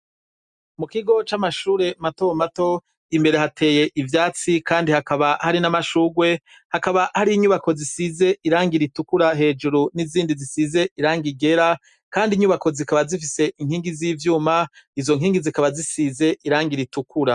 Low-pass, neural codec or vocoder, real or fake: 10.8 kHz; none; real